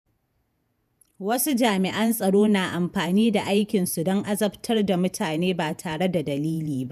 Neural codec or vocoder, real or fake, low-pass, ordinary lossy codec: vocoder, 48 kHz, 128 mel bands, Vocos; fake; 14.4 kHz; none